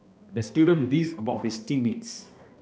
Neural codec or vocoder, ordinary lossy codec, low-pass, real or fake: codec, 16 kHz, 1 kbps, X-Codec, HuBERT features, trained on balanced general audio; none; none; fake